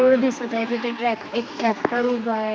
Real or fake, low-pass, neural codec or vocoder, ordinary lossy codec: fake; none; codec, 16 kHz, 2 kbps, X-Codec, HuBERT features, trained on general audio; none